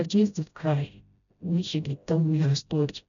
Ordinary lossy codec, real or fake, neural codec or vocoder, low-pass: none; fake; codec, 16 kHz, 0.5 kbps, FreqCodec, smaller model; 7.2 kHz